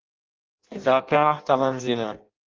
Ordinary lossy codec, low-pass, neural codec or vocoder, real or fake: Opus, 24 kbps; 7.2 kHz; codec, 16 kHz in and 24 kHz out, 0.6 kbps, FireRedTTS-2 codec; fake